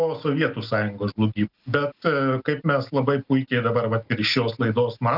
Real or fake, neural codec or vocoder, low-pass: real; none; 5.4 kHz